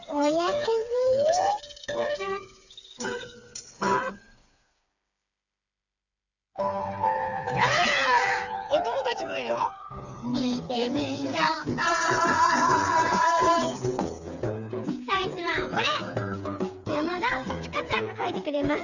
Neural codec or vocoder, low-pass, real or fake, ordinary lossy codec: codec, 16 kHz, 4 kbps, FreqCodec, smaller model; 7.2 kHz; fake; none